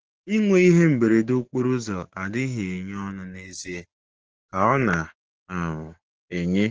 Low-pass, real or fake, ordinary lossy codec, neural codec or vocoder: 7.2 kHz; fake; Opus, 16 kbps; codec, 16 kHz, 6 kbps, DAC